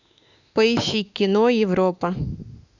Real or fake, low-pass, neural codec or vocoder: fake; 7.2 kHz; autoencoder, 48 kHz, 32 numbers a frame, DAC-VAE, trained on Japanese speech